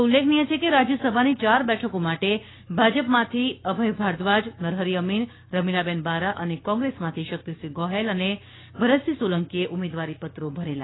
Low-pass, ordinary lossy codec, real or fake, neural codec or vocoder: 7.2 kHz; AAC, 16 kbps; fake; codec, 16 kHz, 6 kbps, DAC